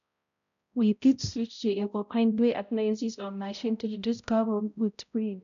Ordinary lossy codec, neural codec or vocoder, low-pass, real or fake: none; codec, 16 kHz, 0.5 kbps, X-Codec, HuBERT features, trained on balanced general audio; 7.2 kHz; fake